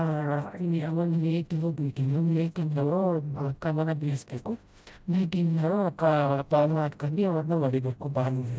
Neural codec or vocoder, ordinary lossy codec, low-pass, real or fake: codec, 16 kHz, 0.5 kbps, FreqCodec, smaller model; none; none; fake